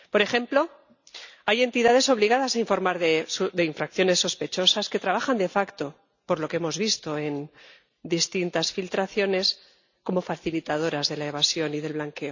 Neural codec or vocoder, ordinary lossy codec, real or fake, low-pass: none; none; real; 7.2 kHz